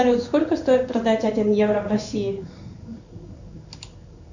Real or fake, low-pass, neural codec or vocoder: fake; 7.2 kHz; codec, 16 kHz in and 24 kHz out, 1 kbps, XY-Tokenizer